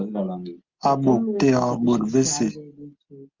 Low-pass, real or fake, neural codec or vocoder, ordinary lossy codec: 7.2 kHz; real; none; Opus, 32 kbps